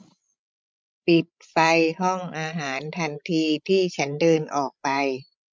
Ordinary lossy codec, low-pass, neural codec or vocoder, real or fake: none; none; codec, 16 kHz, 16 kbps, FreqCodec, larger model; fake